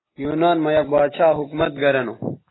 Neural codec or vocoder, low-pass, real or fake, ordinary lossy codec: none; 7.2 kHz; real; AAC, 16 kbps